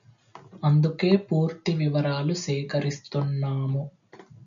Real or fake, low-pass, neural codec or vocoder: real; 7.2 kHz; none